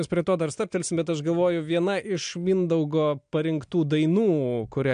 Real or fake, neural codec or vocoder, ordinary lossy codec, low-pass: real; none; MP3, 64 kbps; 9.9 kHz